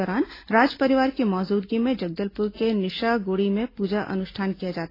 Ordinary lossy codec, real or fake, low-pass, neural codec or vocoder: AAC, 24 kbps; real; 5.4 kHz; none